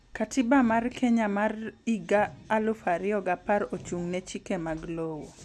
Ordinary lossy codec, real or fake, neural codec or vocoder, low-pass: none; real; none; none